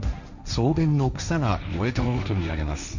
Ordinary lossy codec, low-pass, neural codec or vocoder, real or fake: none; 7.2 kHz; codec, 16 kHz, 1.1 kbps, Voila-Tokenizer; fake